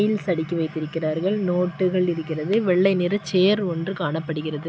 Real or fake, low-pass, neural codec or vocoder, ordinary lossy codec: real; none; none; none